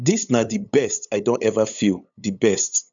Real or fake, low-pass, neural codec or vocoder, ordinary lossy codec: fake; 7.2 kHz; codec, 16 kHz, 8 kbps, FunCodec, trained on LibriTTS, 25 frames a second; none